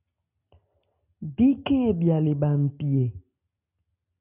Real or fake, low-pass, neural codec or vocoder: real; 3.6 kHz; none